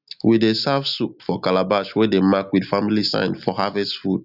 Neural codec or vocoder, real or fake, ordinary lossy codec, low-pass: none; real; none; 5.4 kHz